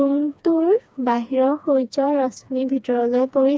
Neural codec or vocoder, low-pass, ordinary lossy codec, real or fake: codec, 16 kHz, 2 kbps, FreqCodec, smaller model; none; none; fake